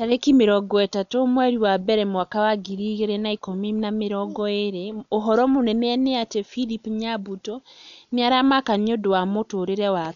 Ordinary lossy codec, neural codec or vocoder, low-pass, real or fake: none; none; 7.2 kHz; real